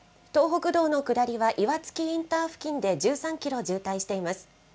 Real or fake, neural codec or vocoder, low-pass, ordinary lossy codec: real; none; none; none